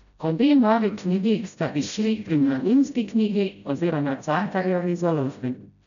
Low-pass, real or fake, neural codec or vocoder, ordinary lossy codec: 7.2 kHz; fake; codec, 16 kHz, 0.5 kbps, FreqCodec, smaller model; none